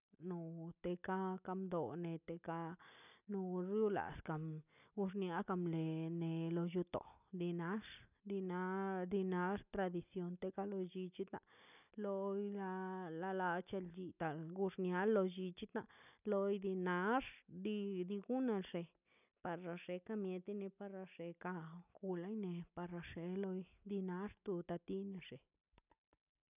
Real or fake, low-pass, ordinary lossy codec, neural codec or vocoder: real; 3.6 kHz; none; none